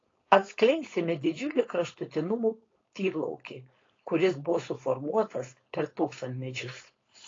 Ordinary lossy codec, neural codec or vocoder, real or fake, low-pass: AAC, 32 kbps; codec, 16 kHz, 4.8 kbps, FACodec; fake; 7.2 kHz